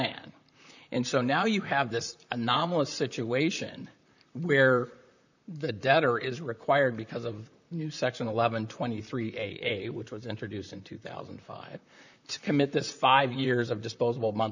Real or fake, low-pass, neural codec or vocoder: fake; 7.2 kHz; vocoder, 44.1 kHz, 128 mel bands, Pupu-Vocoder